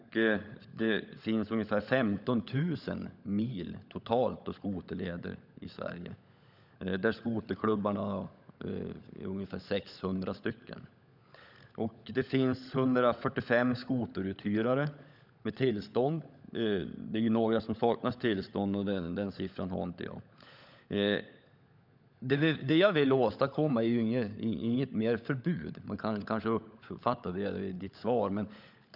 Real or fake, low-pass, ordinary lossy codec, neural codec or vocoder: fake; 5.4 kHz; none; codec, 16 kHz, 16 kbps, FunCodec, trained on LibriTTS, 50 frames a second